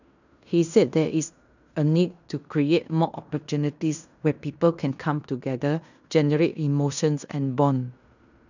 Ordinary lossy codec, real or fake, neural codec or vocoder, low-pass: none; fake; codec, 16 kHz in and 24 kHz out, 0.9 kbps, LongCat-Audio-Codec, fine tuned four codebook decoder; 7.2 kHz